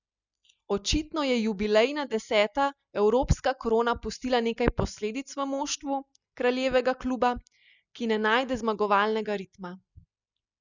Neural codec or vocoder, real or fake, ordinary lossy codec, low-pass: none; real; none; 7.2 kHz